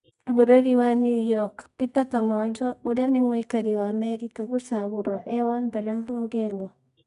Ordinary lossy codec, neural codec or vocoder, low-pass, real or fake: none; codec, 24 kHz, 0.9 kbps, WavTokenizer, medium music audio release; 10.8 kHz; fake